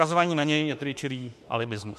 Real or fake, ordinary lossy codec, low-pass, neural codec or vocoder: fake; MP3, 64 kbps; 14.4 kHz; autoencoder, 48 kHz, 32 numbers a frame, DAC-VAE, trained on Japanese speech